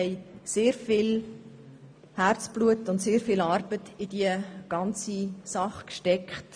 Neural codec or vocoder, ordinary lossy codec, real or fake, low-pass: none; MP3, 64 kbps; real; 9.9 kHz